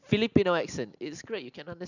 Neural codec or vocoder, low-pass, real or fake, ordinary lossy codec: none; 7.2 kHz; real; none